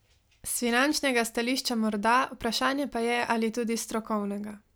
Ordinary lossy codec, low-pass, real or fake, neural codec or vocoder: none; none; real; none